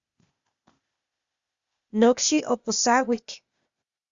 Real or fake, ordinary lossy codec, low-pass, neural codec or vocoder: fake; Opus, 64 kbps; 7.2 kHz; codec, 16 kHz, 0.8 kbps, ZipCodec